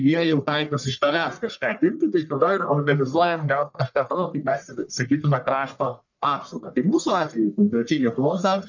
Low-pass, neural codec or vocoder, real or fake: 7.2 kHz; codec, 44.1 kHz, 1.7 kbps, Pupu-Codec; fake